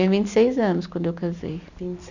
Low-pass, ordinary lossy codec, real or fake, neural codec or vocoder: 7.2 kHz; none; real; none